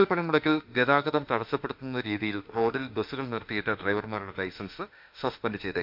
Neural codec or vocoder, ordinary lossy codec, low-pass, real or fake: autoencoder, 48 kHz, 32 numbers a frame, DAC-VAE, trained on Japanese speech; MP3, 48 kbps; 5.4 kHz; fake